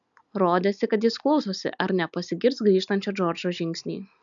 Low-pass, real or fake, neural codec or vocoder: 7.2 kHz; real; none